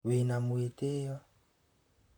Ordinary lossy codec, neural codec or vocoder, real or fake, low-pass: none; vocoder, 44.1 kHz, 128 mel bands, Pupu-Vocoder; fake; none